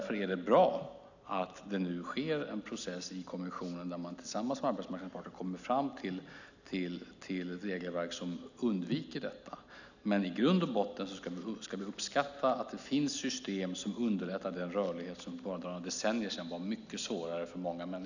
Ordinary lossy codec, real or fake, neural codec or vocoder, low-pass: none; real; none; 7.2 kHz